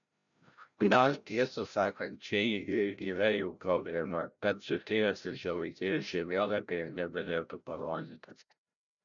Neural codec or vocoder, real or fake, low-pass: codec, 16 kHz, 0.5 kbps, FreqCodec, larger model; fake; 7.2 kHz